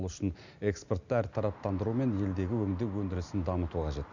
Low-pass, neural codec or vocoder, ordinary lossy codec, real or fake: 7.2 kHz; none; none; real